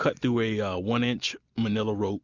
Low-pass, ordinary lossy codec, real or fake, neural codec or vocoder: 7.2 kHz; Opus, 64 kbps; real; none